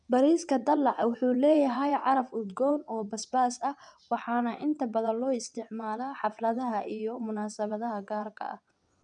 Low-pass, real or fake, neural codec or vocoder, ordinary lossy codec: 9.9 kHz; fake; vocoder, 22.05 kHz, 80 mel bands, WaveNeXt; none